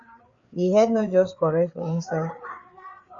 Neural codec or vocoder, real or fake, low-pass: codec, 16 kHz, 4 kbps, FreqCodec, larger model; fake; 7.2 kHz